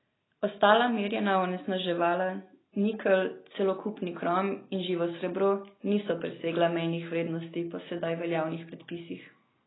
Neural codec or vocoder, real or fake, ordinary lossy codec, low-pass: none; real; AAC, 16 kbps; 7.2 kHz